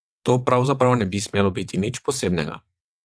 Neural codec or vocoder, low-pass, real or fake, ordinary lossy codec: vocoder, 22.05 kHz, 80 mel bands, WaveNeXt; none; fake; none